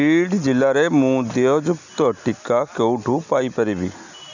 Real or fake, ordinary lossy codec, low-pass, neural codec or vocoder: real; none; 7.2 kHz; none